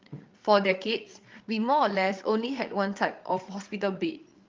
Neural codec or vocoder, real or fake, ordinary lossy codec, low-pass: codec, 16 kHz, 8 kbps, FreqCodec, larger model; fake; Opus, 32 kbps; 7.2 kHz